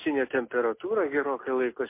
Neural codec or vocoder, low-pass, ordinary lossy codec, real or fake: none; 3.6 kHz; MP3, 24 kbps; real